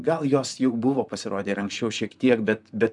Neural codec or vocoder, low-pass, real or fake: vocoder, 44.1 kHz, 128 mel bands, Pupu-Vocoder; 10.8 kHz; fake